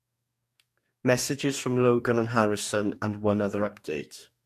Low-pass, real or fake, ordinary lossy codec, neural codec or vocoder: 14.4 kHz; fake; MP3, 64 kbps; codec, 44.1 kHz, 2.6 kbps, DAC